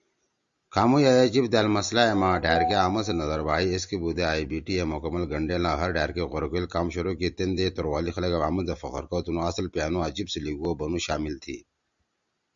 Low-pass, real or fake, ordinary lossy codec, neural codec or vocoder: 7.2 kHz; real; Opus, 64 kbps; none